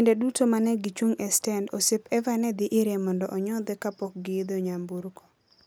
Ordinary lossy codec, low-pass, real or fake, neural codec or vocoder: none; none; real; none